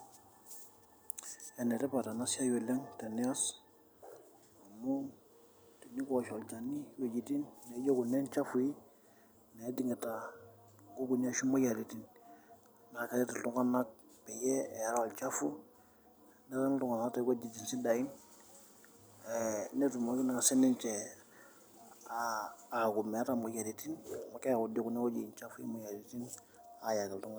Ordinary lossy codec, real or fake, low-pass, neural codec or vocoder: none; real; none; none